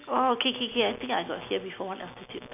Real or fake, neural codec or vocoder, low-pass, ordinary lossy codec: real; none; 3.6 kHz; none